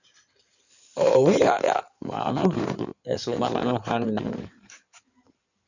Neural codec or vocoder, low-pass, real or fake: codec, 16 kHz in and 24 kHz out, 2.2 kbps, FireRedTTS-2 codec; 7.2 kHz; fake